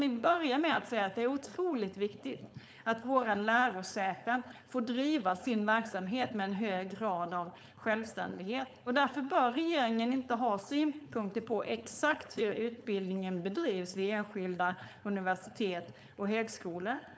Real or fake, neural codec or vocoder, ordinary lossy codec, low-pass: fake; codec, 16 kHz, 4.8 kbps, FACodec; none; none